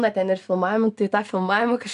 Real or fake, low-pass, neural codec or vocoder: real; 10.8 kHz; none